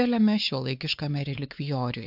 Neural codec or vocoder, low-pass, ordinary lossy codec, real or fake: codec, 16 kHz, 4 kbps, X-Codec, WavLM features, trained on Multilingual LibriSpeech; 5.4 kHz; AAC, 48 kbps; fake